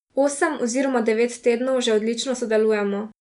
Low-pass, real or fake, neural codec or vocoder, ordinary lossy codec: 10.8 kHz; real; none; none